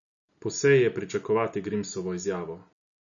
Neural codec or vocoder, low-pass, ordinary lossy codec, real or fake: none; 7.2 kHz; none; real